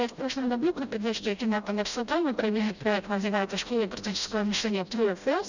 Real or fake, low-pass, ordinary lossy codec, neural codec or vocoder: fake; 7.2 kHz; none; codec, 16 kHz, 0.5 kbps, FreqCodec, smaller model